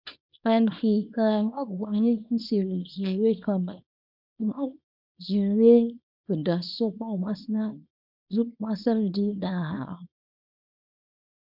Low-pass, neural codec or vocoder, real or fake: 5.4 kHz; codec, 24 kHz, 0.9 kbps, WavTokenizer, small release; fake